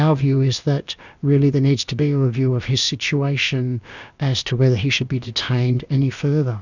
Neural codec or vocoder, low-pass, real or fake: codec, 16 kHz, about 1 kbps, DyCAST, with the encoder's durations; 7.2 kHz; fake